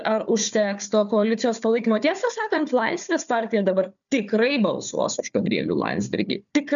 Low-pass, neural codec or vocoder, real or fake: 7.2 kHz; codec, 16 kHz, 4 kbps, FunCodec, trained on Chinese and English, 50 frames a second; fake